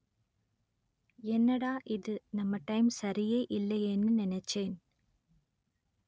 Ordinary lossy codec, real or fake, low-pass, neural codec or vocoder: none; real; none; none